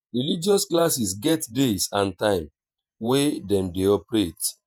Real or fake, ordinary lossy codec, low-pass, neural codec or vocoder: fake; none; none; vocoder, 48 kHz, 128 mel bands, Vocos